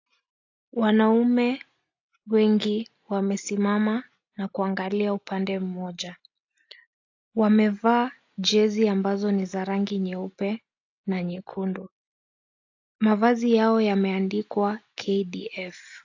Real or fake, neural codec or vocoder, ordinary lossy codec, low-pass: real; none; AAC, 48 kbps; 7.2 kHz